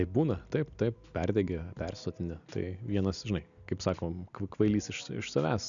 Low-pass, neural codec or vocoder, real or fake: 7.2 kHz; none; real